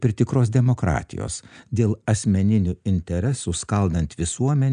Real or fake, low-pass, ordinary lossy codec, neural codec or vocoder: real; 9.9 kHz; AAC, 96 kbps; none